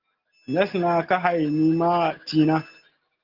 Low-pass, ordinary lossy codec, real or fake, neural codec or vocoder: 5.4 kHz; Opus, 16 kbps; real; none